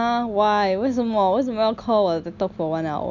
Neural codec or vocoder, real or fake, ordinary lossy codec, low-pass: none; real; none; 7.2 kHz